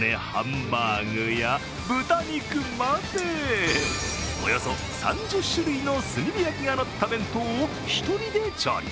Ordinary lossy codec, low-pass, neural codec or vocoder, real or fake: none; none; none; real